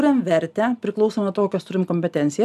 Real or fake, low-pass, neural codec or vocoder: real; 14.4 kHz; none